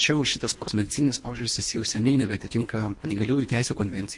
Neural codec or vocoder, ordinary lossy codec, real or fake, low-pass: codec, 24 kHz, 1.5 kbps, HILCodec; MP3, 48 kbps; fake; 10.8 kHz